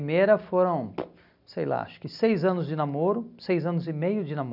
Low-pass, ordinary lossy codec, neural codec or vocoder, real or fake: 5.4 kHz; none; none; real